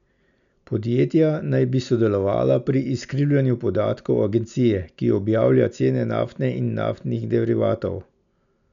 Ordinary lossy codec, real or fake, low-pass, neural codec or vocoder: none; real; 7.2 kHz; none